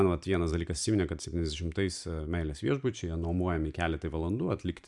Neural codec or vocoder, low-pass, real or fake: vocoder, 44.1 kHz, 128 mel bands every 512 samples, BigVGAN v2; 10.8 kHz; fake